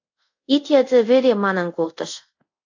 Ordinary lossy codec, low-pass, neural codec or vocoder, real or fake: AAC, 48 kbps; 7.2 kHz; codec, 24 kHz, 0.5 kbps, DualCodec; fake